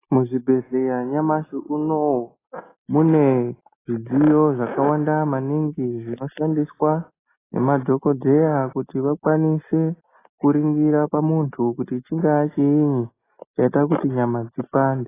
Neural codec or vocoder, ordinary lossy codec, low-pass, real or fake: none; AAC, 16 kbps; 3.6 kHz; real